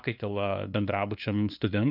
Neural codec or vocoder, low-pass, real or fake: codec, 16 kHz, 2 kbps, FunCodec, trained on LibriTTS, 25 frames a second; 5.4 kHz; fake